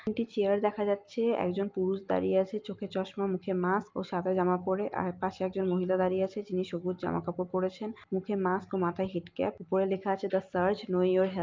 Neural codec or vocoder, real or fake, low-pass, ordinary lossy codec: none; real; 7.2 kHz; Opus, 24 kbps